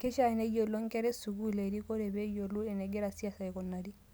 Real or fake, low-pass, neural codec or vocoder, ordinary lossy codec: real; none; none; none